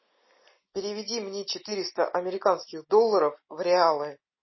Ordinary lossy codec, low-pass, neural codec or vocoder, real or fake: MP3, 24 kbps; 7.2 kHz; none; real